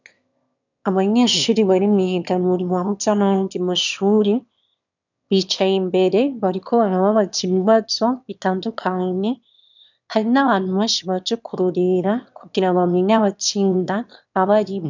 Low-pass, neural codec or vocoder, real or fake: 7.2 kHz; autoencoder, 22.05 kHz, a latent of 192 numbers a frame, VITS, trained on one speaker; fake